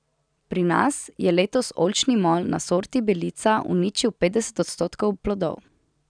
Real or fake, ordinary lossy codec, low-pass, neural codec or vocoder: real; none; 9.9 kHz; none